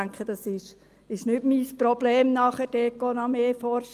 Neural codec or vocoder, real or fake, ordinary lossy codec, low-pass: none; real; Opus, 32 kbps; 14.4 kHz